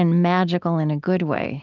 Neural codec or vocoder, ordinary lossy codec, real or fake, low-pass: none; Opus, 32 kbps; real; 7.2 kHz